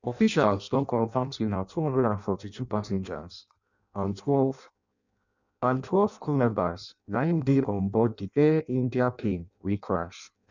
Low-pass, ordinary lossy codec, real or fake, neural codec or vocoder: 7.2 kHz; none; fake; codec, 16 kHz in and 24 kHz out, 0.6 kbps, FireRedTTS-2 codec